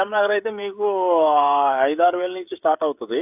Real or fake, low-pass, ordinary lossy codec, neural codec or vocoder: fake; 3.6 kHz; AAC, 32 kbps; codec, 16 kHz, 16 kbps, FreqCodec, smaller model